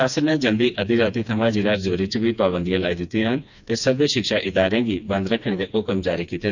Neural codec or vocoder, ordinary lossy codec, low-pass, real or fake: codec, 16 kHz, 2 kbps, FreqCodec, smaller model; none; 7.2 kHz; fake